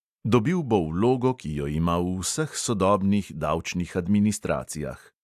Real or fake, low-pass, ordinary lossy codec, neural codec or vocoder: real; 14.4 kHz; AAC, 96 kbps; none